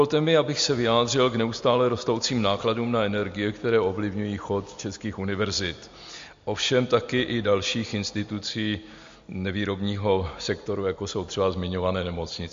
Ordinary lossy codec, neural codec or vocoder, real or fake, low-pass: MP3, 48 kbps; none; real; 7.2 kHz